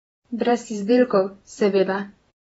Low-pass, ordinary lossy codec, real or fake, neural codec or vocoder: 19.8 kHz; AAC, 24 kbps; fake; vocoder, 48 kHz, 128 mel bands, Vocos